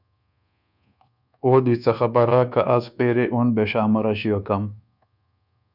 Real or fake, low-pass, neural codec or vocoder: fake; 5.4 kHz; codec, 24 kHz, 1.2 kbps, DualCodec